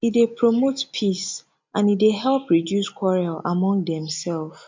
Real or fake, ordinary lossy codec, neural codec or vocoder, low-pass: real; MP3, 64 kbps; none; 7.2 kHz